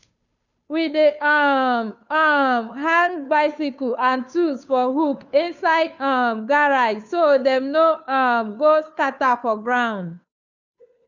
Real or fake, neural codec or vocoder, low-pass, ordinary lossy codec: fake; codec, 16 kHz, 2 kbps, FunCodec, trained on Chinese and English, 25 frames a second; 7.2 kHz; none